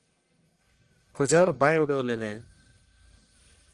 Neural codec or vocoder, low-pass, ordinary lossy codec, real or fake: codec, 44.1 kHz, 1.7 kbps, Pupu-Codec; 10.8 kHz; Opus, 32 kbps; fake